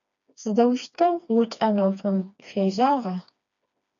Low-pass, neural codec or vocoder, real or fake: 7.2 kHz; codec, 16 kHz, 2 kbps, FreqCodec, smaller model; fake